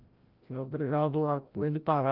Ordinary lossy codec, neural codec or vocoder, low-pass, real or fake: Opus, 32 kbps; codec, 16 kHz, 0.5 kbps, FreqCodec, larger model; 5.4 kHz; fake